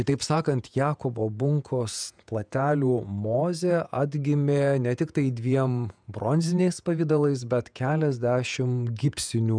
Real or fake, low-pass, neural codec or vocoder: fake; 9.9 kHz; vocoder, 48 kHz, 128 mel bands, Vocos